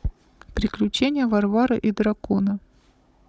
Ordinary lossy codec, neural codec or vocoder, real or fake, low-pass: none; codec, 16 kHz, 16 kbps, FunCodec, trained on Chinese and English, 50 frames a second; fake; none